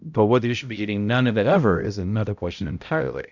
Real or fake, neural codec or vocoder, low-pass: fake; codec, 16 kHz, 0.5 kbps, X-Codec, HuBERT features, trained on balanced general audio; 7.2 kHz